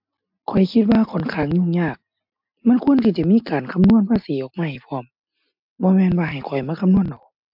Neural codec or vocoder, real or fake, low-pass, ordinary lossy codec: none; real; 5.4 kHz; MP3, 48 kbps